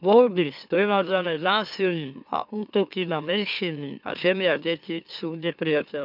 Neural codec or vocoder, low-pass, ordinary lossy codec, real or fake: autoencoder, 44.1 kHz, a latent of 192 numbers a frame, MeloTTS; 5.4 kHz; none; fake